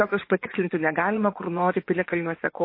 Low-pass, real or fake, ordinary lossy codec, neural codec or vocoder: 5.4 kHz; fake; MP3, 24 kbps; vocoder, 24 kHz, 100 mel bands, Vocos